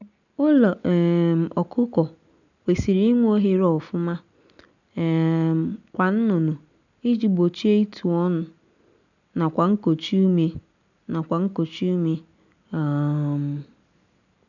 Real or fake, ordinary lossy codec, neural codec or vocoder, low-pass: real; none; none; 7.2 kHz